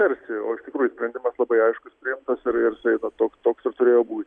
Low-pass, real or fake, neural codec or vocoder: 9.9 kHz; real; none